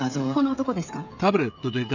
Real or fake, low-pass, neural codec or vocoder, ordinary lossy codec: fake; 7.2 kHz; codec, 16 kHz, 16 kbps, FreqCodec, smaller model; none